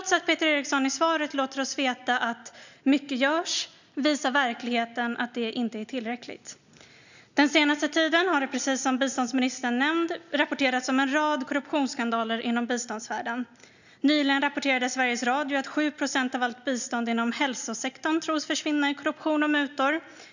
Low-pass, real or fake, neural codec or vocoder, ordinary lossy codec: 7.2 kHz; real; none; none